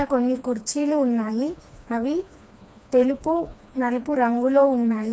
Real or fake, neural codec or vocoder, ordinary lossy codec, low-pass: fake; codec, 16 kHz, 2 kbps, FreqCodec, smaller model; none; none